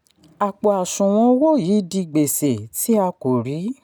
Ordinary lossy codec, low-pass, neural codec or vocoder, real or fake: none; none; none; real